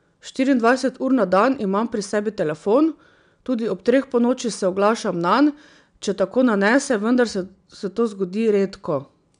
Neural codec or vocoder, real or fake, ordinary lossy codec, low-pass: none; real; none; 9.9 kHz